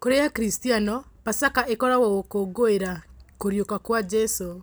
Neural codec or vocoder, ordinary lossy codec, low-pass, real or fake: none; none; none; real